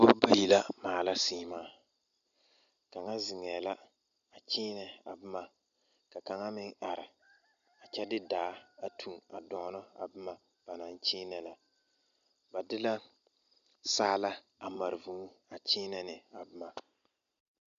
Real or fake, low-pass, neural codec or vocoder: real; 7.2 kHz; none